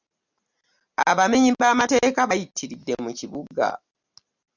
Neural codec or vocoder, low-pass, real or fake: none; 7.2 kHz; real